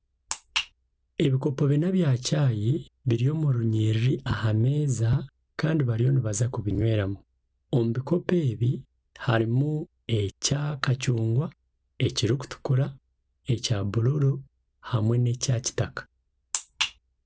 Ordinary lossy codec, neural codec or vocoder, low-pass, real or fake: none; none; none; real